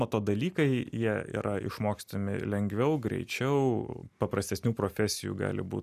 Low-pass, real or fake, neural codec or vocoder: 14.4 kHz; real; none